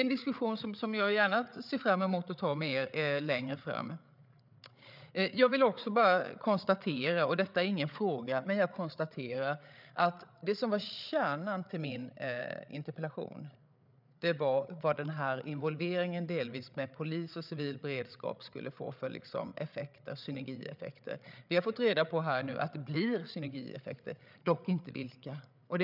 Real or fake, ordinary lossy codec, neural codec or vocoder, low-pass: fake; none; codec, 16 kHz, 8 kbps, FreqCodec, larger model; 5.4 kHz